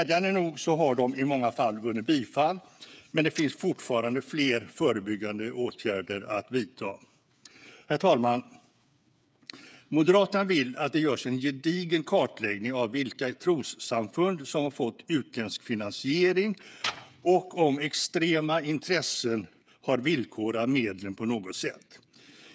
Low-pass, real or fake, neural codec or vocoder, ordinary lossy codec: none; fake; codec, 16 kHz, 8 kbps, FreqCodec, smaller model; none